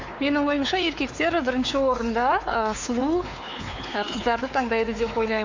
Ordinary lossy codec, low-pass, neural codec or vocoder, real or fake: AAC, 48 kbps; 7.2 kHz; codec, 16 kHz, 4 kbps, X-Codec, WavLM features, trained on Multilingual LibriSpeech; fake